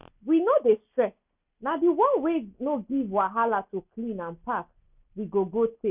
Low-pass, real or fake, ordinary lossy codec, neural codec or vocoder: 3.6 kHz; real; none; none